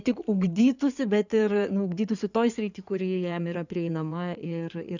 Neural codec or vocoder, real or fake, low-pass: codec, 16 kHz in and 24 kHz out, 2.2 kbps, FireRedTTS-2 codec; fake; 7.2 kHz